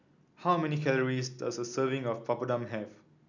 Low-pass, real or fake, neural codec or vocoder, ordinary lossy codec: 7.2 kHz; real; none; none